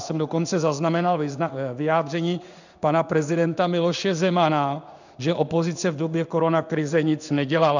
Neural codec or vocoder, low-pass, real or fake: codec, 16 kHz in and 24 kHz out, 1 kbps, XY-Tokenizer; 7.2 kHz; fake